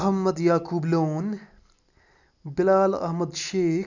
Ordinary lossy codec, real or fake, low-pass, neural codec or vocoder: none; real; 7.2 kHz; none